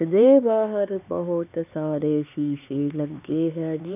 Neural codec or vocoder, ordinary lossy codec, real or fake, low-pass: codec, 16 kHz, 4 kbps, X-Codec, HuBERT features, trained on LibriSpeech; none; fake; 3.6 kHz